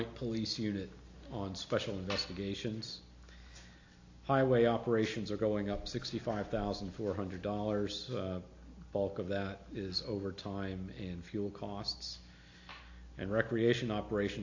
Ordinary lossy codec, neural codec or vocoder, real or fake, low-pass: AAC, 48 kbps; none; real; 7.2 kHz